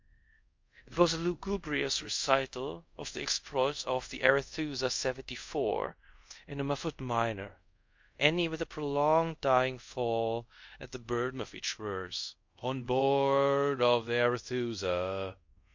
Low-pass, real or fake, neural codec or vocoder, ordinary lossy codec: 7.2 kHz; fake; codec, 24 kHz, 0.5 kbps, DualCodec; MP3, 48 kbps